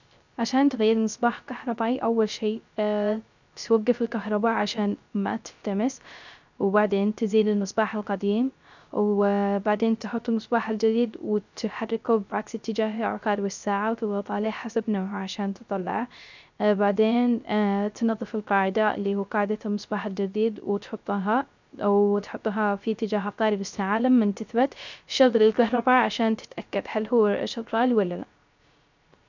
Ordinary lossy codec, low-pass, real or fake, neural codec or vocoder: none; 7.2 kHz; fake; codec, 16 kHz, 0.3 kbps, FocalCodec